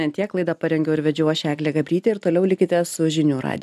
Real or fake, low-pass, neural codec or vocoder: real; 14.4 kHz; none